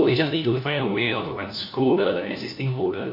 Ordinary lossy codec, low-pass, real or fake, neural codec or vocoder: MP3, 32 kbps; 5.4 kHz; fake; codec, 16 kHz, 1 kbps, FunCodec, trained on LibriTTS, 50 frames a second